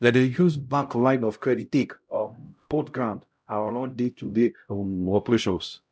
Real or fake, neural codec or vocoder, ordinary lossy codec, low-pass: fake; codec, 16 kHz, 0.5 kbps, X-Codec, HuBERT features, trained on LibriSpeech; none; none